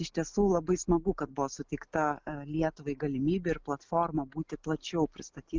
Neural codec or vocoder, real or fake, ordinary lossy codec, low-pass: none; real; Opus, 24 kbps; 7.2 kHz